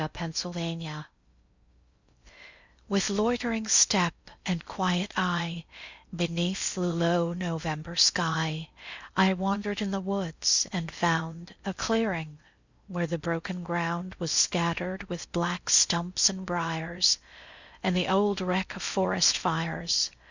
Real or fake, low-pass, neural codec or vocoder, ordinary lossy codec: fake; 7.2 kHz; codec, 16 kHz in and 24 kHz out, 0.6 kbps, FocalCodec, streaming, 2048 codes; Opus, 64 kbps